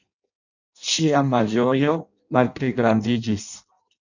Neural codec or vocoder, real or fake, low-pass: codec, 16 kHz in and 24 kHz out, 0.6 kbps, FireRedTTS-2 codec; fake; 7.2 kHz